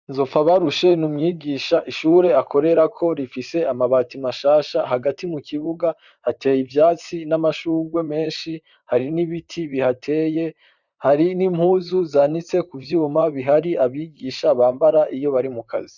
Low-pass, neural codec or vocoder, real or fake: 7.2 kHz; vocoder, 44.1 kHz, 128 mel bands, Pupu-Vocoder; fake